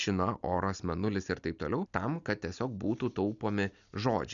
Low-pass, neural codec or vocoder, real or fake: 7.2 kHz; none; real